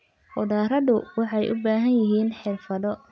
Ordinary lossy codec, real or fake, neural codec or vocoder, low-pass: none; real; none; none